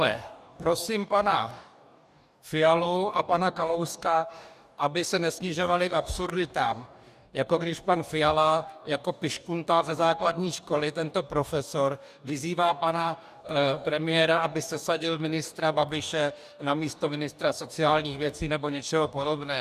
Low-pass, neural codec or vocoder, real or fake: 14.4 kHz; codec, 44.1 kHz, 2.6 kbps, DAC; fake